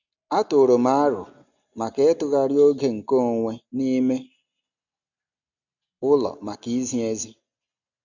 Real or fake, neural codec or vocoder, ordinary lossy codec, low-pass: real; none; none; 7.2 kHz